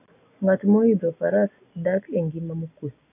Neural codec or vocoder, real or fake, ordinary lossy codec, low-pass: none; real; AAC, 24 kbps; 3.6 kHz